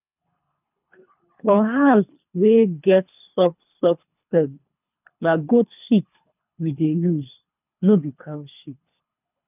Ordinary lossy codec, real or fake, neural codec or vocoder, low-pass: none; fake; codec, 24 kHz, 3 kbps, HILCodec; 3.6 kHz